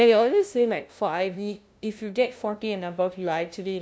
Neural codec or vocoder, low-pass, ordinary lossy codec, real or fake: codec, 16 kHz, 0.5 kbps, FunCodec, trained on LibriTTS, 25 frames a second; none; none; fake